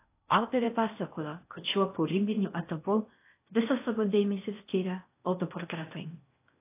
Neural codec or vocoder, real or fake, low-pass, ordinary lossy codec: codec, 16 kHz in and 24 kHz out, 0.6 kbps, FocalCodec, streaming, 4096 codes; fake; 3.6 kHz; AAC, 24 kbps